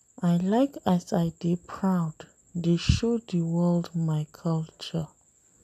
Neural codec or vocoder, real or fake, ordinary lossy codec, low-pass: none; real; none; 14.4 kHz